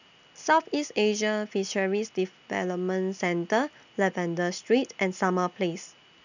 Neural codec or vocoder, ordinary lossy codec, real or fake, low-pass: none; none; real; 7.2 kHz